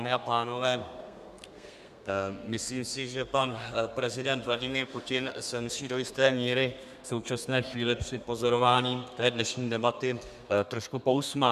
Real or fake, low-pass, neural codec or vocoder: fake; 14.4 kHz; codec, 32 kHz, 1.9 kbps, SNAC